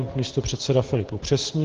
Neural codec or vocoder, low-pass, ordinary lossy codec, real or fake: none; 7.2 kHz; Opus, 16 kbps; real